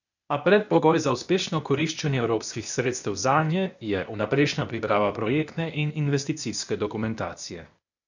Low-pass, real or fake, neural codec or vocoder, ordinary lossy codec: 7.2 kHz; fake; codec, 16 kHz, 0.8 kbps, ZipCodec; none